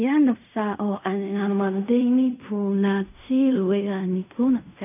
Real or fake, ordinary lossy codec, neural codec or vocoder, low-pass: fake; none; codec, 16 kHz in and 24 kHz out, 0.4 kbps, LongCat-Audio-Codec, fine tuned four codebook decoder; 3.6 kHz